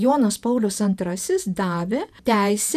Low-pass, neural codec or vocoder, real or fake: 14.4 kHz; vocoder, 48 kHz, 128 mel bands, Vocos; fake